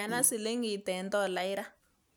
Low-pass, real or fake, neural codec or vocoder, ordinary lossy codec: none; real; none; none